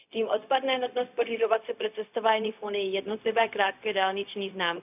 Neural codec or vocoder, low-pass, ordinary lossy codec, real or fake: codec, 16 kHz, 0.4 kbps, LongCat-Audio-Codec; 3.6 kHz; none; fake